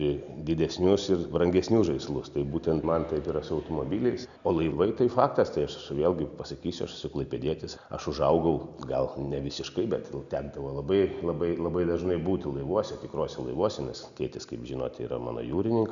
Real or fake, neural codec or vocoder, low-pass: real; none; 7.2 kHz